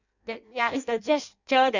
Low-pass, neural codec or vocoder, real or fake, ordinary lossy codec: 7.2 kHz; codec, 16 kHz in and 24 kHz out, 0.6 kbps, FireRedTTS-2 codec; fake; none